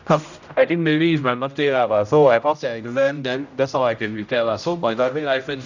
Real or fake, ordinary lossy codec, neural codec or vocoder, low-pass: fake; none; codec, 16 kHz, 0.5 kbps, X-Codec, HuBERT features, trained on general audio; 7.2 kHz